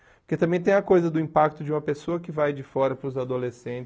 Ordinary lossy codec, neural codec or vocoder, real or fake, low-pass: none; none; real; none